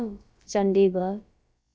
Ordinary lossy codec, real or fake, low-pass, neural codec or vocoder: none; fake; none; codec, 16 kHz, about 1 kbps, DyCAST, with the encoder's durations